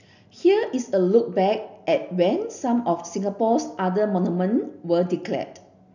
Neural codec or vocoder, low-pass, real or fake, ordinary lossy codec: none; 7.2 kHz; real; none